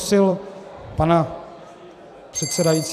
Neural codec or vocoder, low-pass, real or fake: vocoder, 44.1 kHz, 128 mel bands every 256 samples, BigVGAN v2; 14.4 kHz; fake